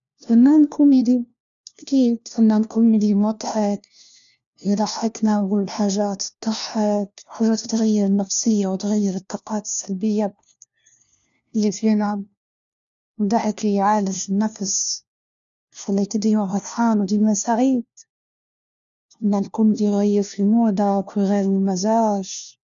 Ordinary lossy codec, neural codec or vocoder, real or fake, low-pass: none; codec, 16 kHz, 1 kbps, FunCodec, trained on LibriTTS, 50 frames a second; fake; 7.2 kHz